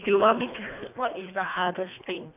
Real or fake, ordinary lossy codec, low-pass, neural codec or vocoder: fake; none; 3.6 kHz; codec, 24 kHz, 1.5 kbps, HILCodec